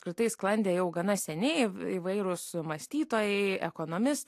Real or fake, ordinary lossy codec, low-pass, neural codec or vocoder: real; AAC, 64 kbps; 14.4 kHz; none